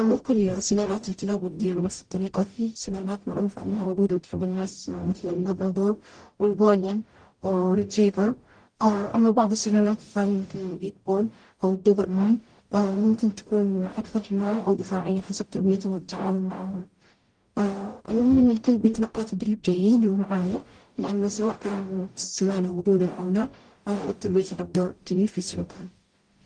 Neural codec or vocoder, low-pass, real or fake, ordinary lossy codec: codec, 44.1 kHz, 0.9 kbps, DAC; 9.9 kHz; fake; Opus, 24 kbps